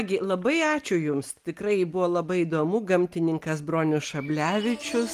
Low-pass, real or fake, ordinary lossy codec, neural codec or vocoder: 14.4 kHz; real; Opus, 32 kbps; none